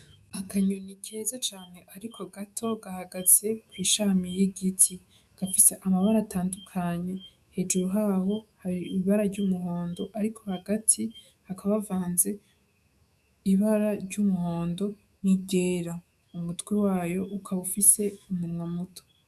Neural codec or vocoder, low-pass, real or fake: autoencoder, 48 kHz, 128 numbers a frame, DAC-VAE, trained on Japanese speech; 14.4 kHz; fake